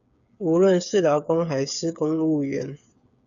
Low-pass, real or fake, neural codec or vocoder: 7.2 kHz; fake; codec, 16 kHz, 8 kbps, FreqCodec, smaller model